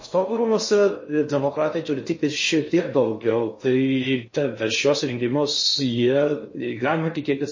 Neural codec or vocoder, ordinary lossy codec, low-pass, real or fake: codec, 16 kHz in and 24 kHz out, 0.6 kbps, FocalCodec, streaming, 4096 codes; MP3, 32 kbps; 7.2 kHz; fake